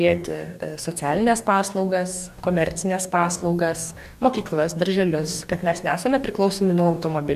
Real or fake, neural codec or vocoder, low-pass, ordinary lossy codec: fake; codec, 44.1 kHz, 2.6 kbps, DAC; 14.4 kHz; MP3, 96 kbps